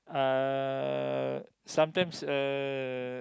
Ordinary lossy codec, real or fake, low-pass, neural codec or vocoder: none; real; none; none